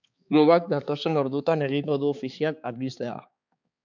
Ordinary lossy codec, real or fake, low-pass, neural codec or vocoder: MP3, 64 kbps; fake; 7.2 kHz; codec, 16 kHz, 4 kbps, X-Codec, HuBERT features, trained on balanced general audio